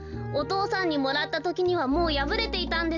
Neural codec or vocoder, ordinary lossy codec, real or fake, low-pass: none; none; real; 7.2 kHz